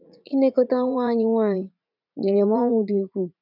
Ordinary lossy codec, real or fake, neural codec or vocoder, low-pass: none; fake; vocoder, 44.1 kHz, 80 mel bands, Vocos; 5.4 kHz